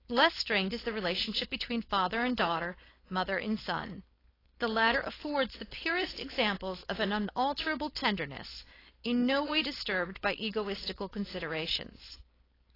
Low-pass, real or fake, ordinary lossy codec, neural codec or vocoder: 5.4 kHz; fake; AAC, 24 kbps; codec, 16 kHz, 4.8 kbps, FACodec